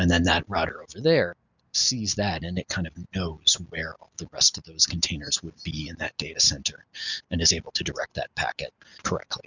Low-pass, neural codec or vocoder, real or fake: 7.2 kHz; none; real